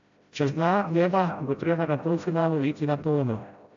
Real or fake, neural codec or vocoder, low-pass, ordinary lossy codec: fake; codec, 16 kHz, 0.5 kbps, FreqCodec, smaller model; 7.2 kHz; AAC, 64 kbps